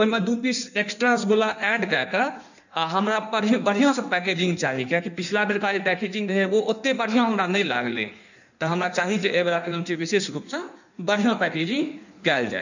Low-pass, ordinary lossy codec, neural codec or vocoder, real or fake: 7.2 kHz; none; codec, 16 kHz in and 24 kHz out, 1.1 kbps, FireRedTTS-2 codec; fake